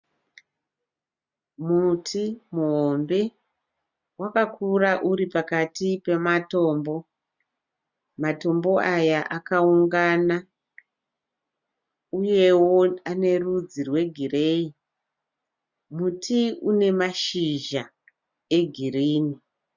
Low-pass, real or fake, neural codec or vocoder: 7.2 kHz; real; none